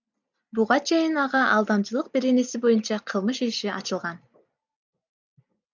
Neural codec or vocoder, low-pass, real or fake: none; 7.2 kHz; real